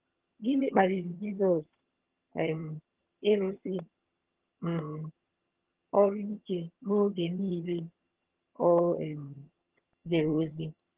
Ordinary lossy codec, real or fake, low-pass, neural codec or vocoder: Opus, 16 kbps; fake; 3.6 kHz; vocoder, 22.05 kHz, 80 mel bands, HiFi-GAN